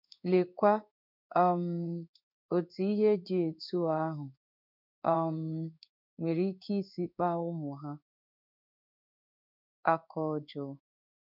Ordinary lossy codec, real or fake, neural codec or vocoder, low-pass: none; fake; codec, 16 kHz in and 24 kHz out, 1 kbps, XY-Tokenizer; 5.4 kHz